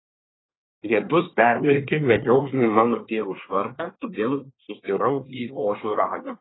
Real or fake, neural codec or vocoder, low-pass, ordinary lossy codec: fake; codec, 24 kHz, 1 kbps, SNAC; 7.2 kHz; AAC, 16 kbps